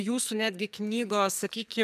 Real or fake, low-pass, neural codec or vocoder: fake; 14.4 kHz; codec, 44.1 kHz, 2.6 kbps, SNAC